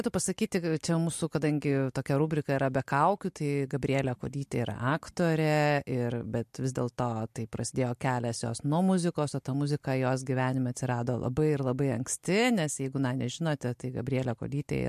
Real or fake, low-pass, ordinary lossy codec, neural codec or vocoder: real; 14.4 kHz; MP3, 64 kbps; none